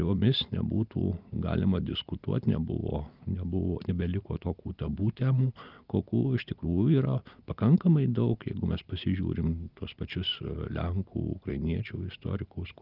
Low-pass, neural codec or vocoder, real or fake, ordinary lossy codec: 5.4 kHz; none; real; Opus, 24 kbps